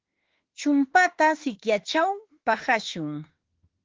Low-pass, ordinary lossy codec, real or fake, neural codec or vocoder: 7.2 kHz; Opus, 16 kbps; fake; autoencoder, 48 kHz, 32 numbers a frame, DAC-VAE, trained on Japanese speech